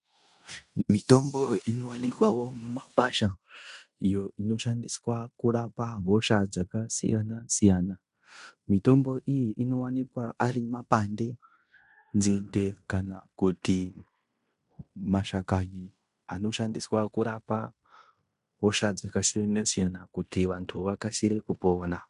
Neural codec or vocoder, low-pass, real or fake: codec, 16 kHz in and 24 kHz out, 0.9 kbps, LongCat-Audio-Codec, fine tuned four codebook decoder; 10.8 kHz; fake